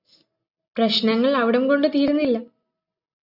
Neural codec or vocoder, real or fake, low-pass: none; real; 5.4 kHz